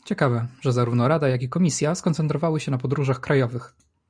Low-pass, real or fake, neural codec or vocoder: 9.9 kHz; real; none